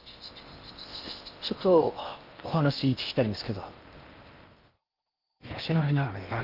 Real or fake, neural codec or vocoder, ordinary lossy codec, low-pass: fake; codec, 16 kHz in and 24 kHz out, 0.8 kbps, FocalCodec, streaming, 65536 codes; Opus, 32 kbps; 5.4 kHz